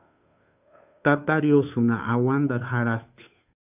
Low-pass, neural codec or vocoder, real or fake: 3.6 kHz; codec, 16 kHz, 2 kbps, FunCodec, trained on Chinese and English, 25 frames a second; fake